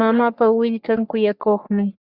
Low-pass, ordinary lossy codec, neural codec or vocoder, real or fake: 5.4 kHz; Opus, 64 kbps; codec, 44.1 kHz, 3.4 kbps, Pupu-Codec; fake